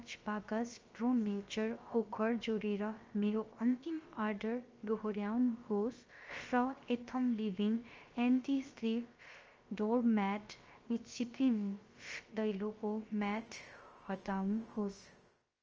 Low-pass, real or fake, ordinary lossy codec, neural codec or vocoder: 7.2 kHz; fake; Opus, 24 kbps; codec, 16 kHz, about 1 kbps, DyCAST, with the encoder's durations